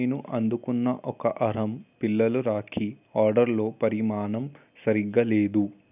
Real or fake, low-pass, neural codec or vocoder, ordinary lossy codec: real; 3.6 kHz; none; none